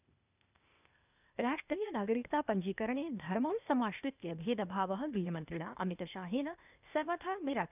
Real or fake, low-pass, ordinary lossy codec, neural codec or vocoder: fake; 3.6 kHz; none; codec, 16 kHz, 0.8 kbps, ZipCodec